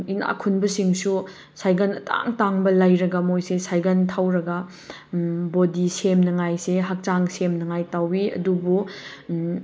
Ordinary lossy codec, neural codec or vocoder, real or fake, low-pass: none; none; real; none